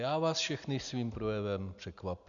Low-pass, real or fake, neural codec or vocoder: 7.2 kHz; real; none